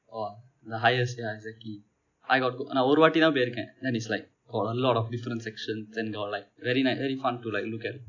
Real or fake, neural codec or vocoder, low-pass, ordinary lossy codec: real; none; 7.2 kHz; none